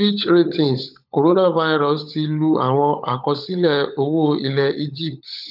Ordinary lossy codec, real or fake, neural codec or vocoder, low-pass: none; fake; codec, 16 kHz, 16 kbps, FunCodec, trained on LibriTTS, 50 frames a second; 5.4 kHz